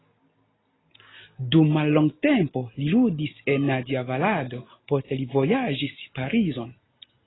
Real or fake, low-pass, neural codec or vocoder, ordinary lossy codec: real; 7.2 kHz; none; AAC, 16 kbps